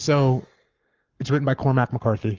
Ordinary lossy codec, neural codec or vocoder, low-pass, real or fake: Opus, 32 kbps; codec, 44.1 kHz, 7.8 kbps, Pupu-Codec; 7.2 kHz; fake